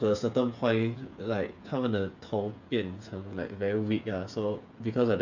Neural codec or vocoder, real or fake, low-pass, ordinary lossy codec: codec, 16 kHz, 8 kbps, FreqCodec, smaller model; fake; 7.2 kHz; none